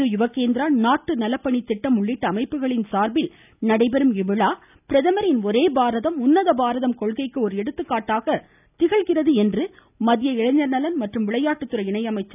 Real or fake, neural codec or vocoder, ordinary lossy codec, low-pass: real; none; none; 3.6 kHz